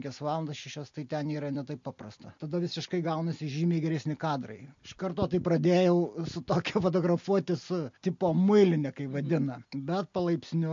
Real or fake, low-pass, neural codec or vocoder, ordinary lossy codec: real; 7.2 kHz; none; MP3, 48 kbps